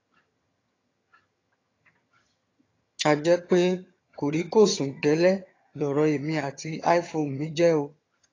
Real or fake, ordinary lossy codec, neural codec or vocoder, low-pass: fake; AAC, 32 kbps; vocoder, 22.05 kHz, 80 mel bands, HiFi-GAN; 7.2 kHz